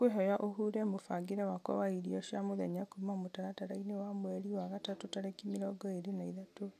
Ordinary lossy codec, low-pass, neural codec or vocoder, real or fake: none; 14.4 kHz; none; real